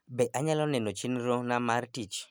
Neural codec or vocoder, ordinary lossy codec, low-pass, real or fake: none; none; none; real